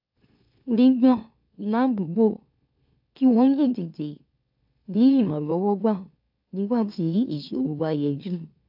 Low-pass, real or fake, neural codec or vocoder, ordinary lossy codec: 5.4 kHz; fake; autoencoder, 44.1 kHz, a latent of 192 numbers a frame, MeloTTS; AAC, 48 kbps